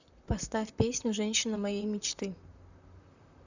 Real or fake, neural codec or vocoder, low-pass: fake; vocoder, 44.1 kHz, 128 mel bands, Pupu-Vocoder; 7.2 kHz